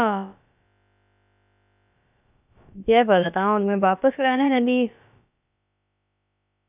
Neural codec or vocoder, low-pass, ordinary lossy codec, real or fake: codec, 16 kHz, about 1 kbps, DyCAST, with the encoder's durations; 3.6 kHz; none; fake